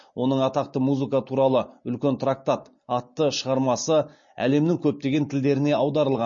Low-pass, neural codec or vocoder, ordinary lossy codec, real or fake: 7.2 kHz; none; MP3, 32 kbps; real